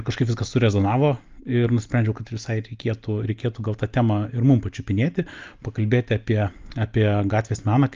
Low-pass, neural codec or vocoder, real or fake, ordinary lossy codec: 7.2 kHz; none; real; Opus, 32 kbps